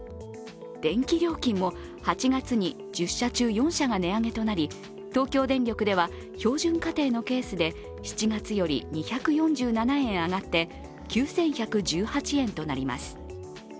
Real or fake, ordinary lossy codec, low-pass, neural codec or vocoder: real; none; none; none